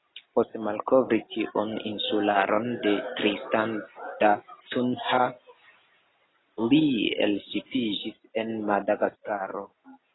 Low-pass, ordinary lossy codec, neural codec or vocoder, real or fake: 7.2 kHz; AAC, 16 kbps; none; real